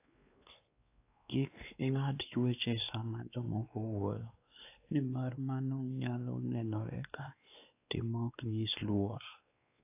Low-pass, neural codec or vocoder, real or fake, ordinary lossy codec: 3.6 kHz; codec, 16 kHz, 2 kbps, X-Codec, WavLM features, trained on Multilingual LibriSpeech; fake; AAC, 24 kbps